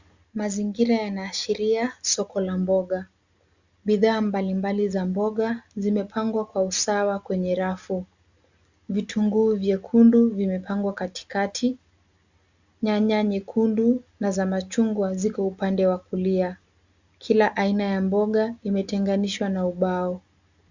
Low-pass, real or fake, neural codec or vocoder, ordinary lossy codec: 7.2 kHz; real; none; Opus, 64 kbps